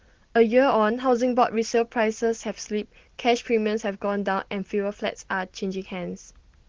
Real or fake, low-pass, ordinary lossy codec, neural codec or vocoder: real; 7.2 kHz; Opus, 16 kbps; none